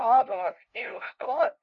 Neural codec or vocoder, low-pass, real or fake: codec, 16 kHz, 0.5 kbps, FunCodec, trained on LibriTTS, 25 frames a second; 7.2 kHz; fake